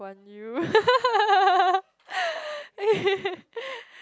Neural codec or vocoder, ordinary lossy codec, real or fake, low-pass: none; none; real; none